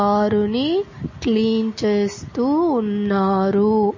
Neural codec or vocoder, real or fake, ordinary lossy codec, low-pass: none; real; MP3, 32 kbps; 7.2 kHz